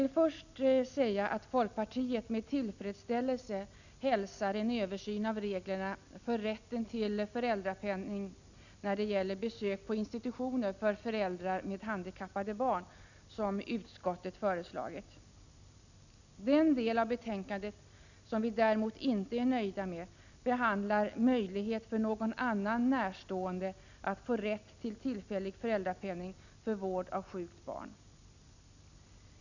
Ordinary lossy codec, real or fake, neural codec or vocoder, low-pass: none; real; none; 7.2 kHz